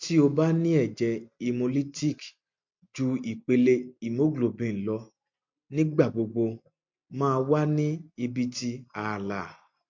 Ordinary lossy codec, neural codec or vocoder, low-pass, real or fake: MP3, 48 kbps; none; 7.2 kHz; real